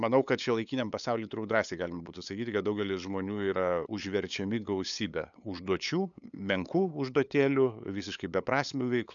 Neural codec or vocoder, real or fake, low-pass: codec, 16 kHz, 8 kbps, FunCodec, trained on LibriTTS, 25 frames a second; fake; 7.2 kHz